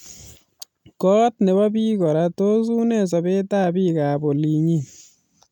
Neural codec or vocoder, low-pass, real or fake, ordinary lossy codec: none; 19.8 kHz; real; none